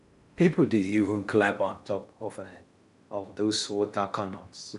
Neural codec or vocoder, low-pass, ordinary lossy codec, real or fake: codec, 16 kHz in and 24 kHz out, 0.6 kbps, FocalCodec, streaming, 4096 codes; 10.8 kHz; none; fake